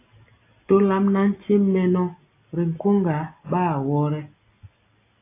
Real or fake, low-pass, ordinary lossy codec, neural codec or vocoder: real; 3.6 kHz; AAC, 24 kbps; none